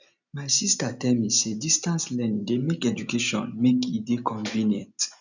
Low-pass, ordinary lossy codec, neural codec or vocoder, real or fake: 7.2 kHz; none; none; real